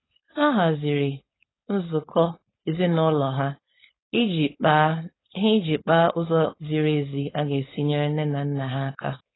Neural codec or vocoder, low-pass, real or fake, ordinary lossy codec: codec, 16 kHz, 4.8 kbps, FACodec; 7.2 kHz; fake; AAC, 16 kbps